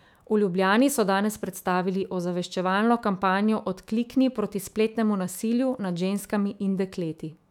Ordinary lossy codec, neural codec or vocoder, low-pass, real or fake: none; autoencoder, 48 kHz, 128 numbers a frame, DAC-VAE, trained on Japanese speech; 19.8 kHz; fake